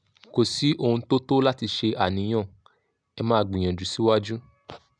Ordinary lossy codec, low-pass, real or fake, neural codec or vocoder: none; 9.9 kHz; real; none